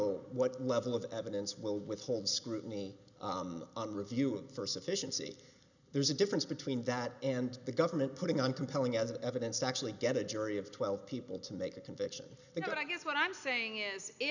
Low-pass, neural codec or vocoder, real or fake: 7.2 kHz; none; real